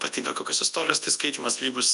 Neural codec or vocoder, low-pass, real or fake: codec, 24 kHz, 0.9 kbps, WavTokenizer, large speech release; 10.8 kHz; fake